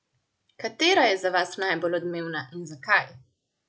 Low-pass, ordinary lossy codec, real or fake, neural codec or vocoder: none; none; real; none